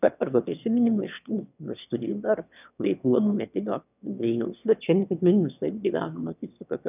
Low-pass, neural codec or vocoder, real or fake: 3.6 kHz; autoencoder, 22.05 kHz, a latent of 192 numbers a frame, VITS, trained on one speaker; fake